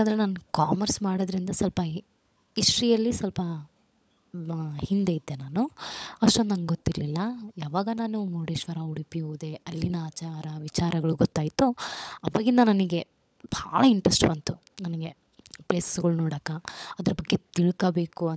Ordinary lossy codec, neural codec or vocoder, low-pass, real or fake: none; codec, 16 kHz, 16 kbps, FunCodec, trained on LibriTTS, 50 frames a second; none; fake